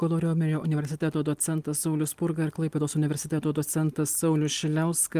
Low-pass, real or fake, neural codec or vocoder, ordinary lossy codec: 14.4 kHz; real; none; Opus, 24 kbps